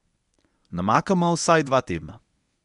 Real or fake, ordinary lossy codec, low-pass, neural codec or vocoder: fake; none; 10.8 kHz; codec, 24 kHz, 0.9 kbps, WavTokenizer, medium speech release version 1